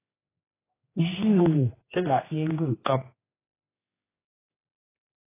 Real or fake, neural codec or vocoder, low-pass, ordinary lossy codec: fake; codec, 16 kHz, 2 kbps, X-Codec, HuBERT features, trained on general audio; 3.6 kHz; AAC, 16 kbps